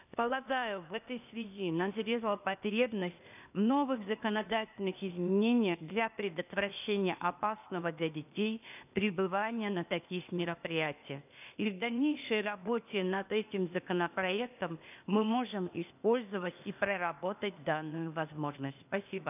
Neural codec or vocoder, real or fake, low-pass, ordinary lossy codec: codec, 16 kHz, 0.8 kbps, ZipCodec; fake; 3.6 kHz; none